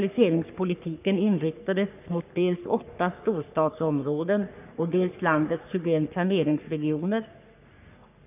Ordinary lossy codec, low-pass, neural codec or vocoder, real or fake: none; 3.6 kHz; codec, 44.1 kHz, 3.4 kbps, Pupu-Codec; fake